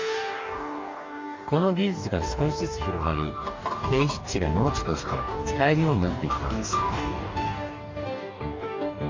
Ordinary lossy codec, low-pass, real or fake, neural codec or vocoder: MP3, 48 kbps; 7.2 kHz; fake; codec, 44.1 kHz, 2.6 kbps, DAC